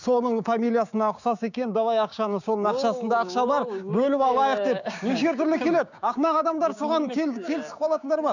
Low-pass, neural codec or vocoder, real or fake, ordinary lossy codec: 7.2 kHz; codec, 16 kHz, 6 kbps, DAC; fake; none